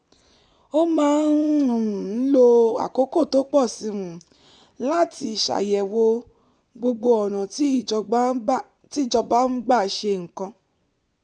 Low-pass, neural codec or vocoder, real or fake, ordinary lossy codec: 9.9 kHz; vocoder, 24 kHz, 100 mel bands, Vocos; fake; none